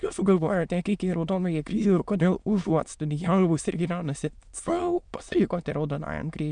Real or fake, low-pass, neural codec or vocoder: fake; 9.9 kHz; autoencoder, 22.05 kHz, a latent of 192 numbers a frame, VITS, trained on many speakers